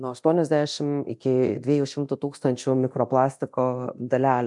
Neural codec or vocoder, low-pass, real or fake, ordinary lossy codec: codec, 24 kHz, 0.9 kbps, DualCodec; 10.8 kHz; fake; MP3, 64 kbps